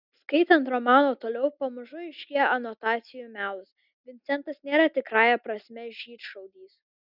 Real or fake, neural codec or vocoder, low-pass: real; none; 5.4 kHz